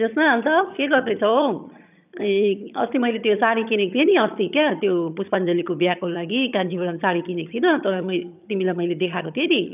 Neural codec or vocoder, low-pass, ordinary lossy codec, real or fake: vocoder, 22.05 kHz, 80 mel bands, HiFi-GAN; 3.6 kHz; none; fake